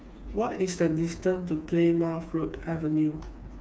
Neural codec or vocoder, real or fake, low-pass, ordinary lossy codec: codec, 16 kHz, 4 kbps, FreqCodec, smaller model; fake; none; none